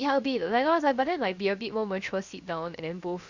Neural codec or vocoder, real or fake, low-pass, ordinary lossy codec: codec, 16 kHz, 0.7 kbps, FocalCodec; fake; 7.2 kHz; Opus, 64 kbps